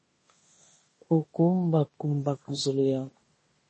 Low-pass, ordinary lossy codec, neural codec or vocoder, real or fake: 10.8 kHz; MP3, 32 kbps; codec, 16 kHz in and 24 kHz out, 0.9 kbps, LongCat-Audio-Codec, fine tuned four codebook decoder; fake